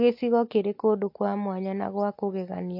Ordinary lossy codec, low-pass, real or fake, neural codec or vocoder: MP3, 32 kbps; 5.4 kHz; real; none